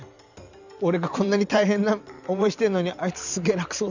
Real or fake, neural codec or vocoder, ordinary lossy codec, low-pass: fake; vocoder, 44.1 kHz, 128 mel bands every 512 samples, BigVGAN v2; none; 7.2 kHz